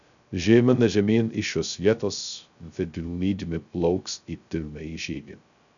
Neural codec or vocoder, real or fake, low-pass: codec, 16 kHz, 0.2 kbps, FocalCodec; fake; 7.2 kHz